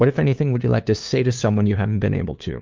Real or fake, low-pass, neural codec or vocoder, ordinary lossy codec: fake; 7.2 kHz; codec, 16 kHz, 2 kbps, FunCodec, trained on LibriTTS, 25 frames a second; Opus, 32 kbps